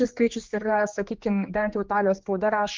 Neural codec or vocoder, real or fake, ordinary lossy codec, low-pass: codec, 16 kHz, 2 kbps, X-Codec, HuBERT features, trained on general audio; fake; Opus, 32 kbps; 7.2 kHz